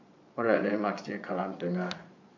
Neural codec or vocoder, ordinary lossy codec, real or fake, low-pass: vocoder, 22.05 kHz, 80 mel bands, Vocos; AAC, 48 kbps; fake; 7.2 kHz